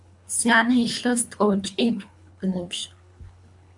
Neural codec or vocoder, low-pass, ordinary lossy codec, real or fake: codec, 24 kHz, 3 kbps, HILCodec; 10.8 kHz; AAC, 64 kbps; fake